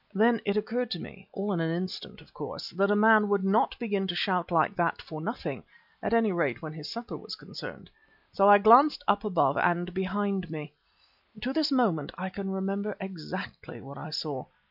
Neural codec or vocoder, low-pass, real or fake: none; 5.4 kHz; real